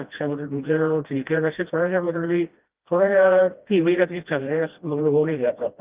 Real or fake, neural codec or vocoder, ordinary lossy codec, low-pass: fake; codec, 16 kHz, 1 kbps, FreqCodec, smaller model; Opus, 32 kbps; 3.6 kHz